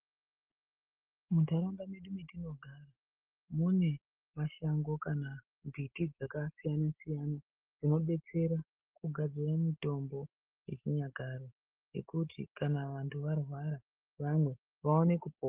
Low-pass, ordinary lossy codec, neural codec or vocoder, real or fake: 3.6 kHz; Opus, 16 kbps; none; real